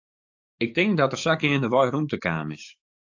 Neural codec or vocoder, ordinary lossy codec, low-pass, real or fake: codec, 44.1 kHz, 7.8 kbps, DAC; AAC, 48 kbps; 7.2 kHz; fake